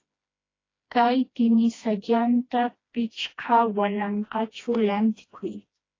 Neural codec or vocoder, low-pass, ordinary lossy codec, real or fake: codec, 16 kHz, 1 kbps, FreqCodec, smaller model; 7.2 kHz; AAC, 32 kbps; fake